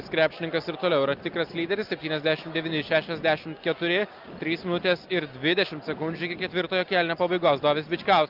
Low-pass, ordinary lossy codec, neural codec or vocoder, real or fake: 5.4 kHz; Opus, 24 kbps; none; real